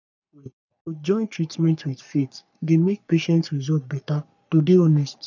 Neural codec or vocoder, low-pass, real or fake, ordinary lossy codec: codec, 44.1 kHz, 3.4 kbps, Pupu-Codec; 7.2 kHz; fake; none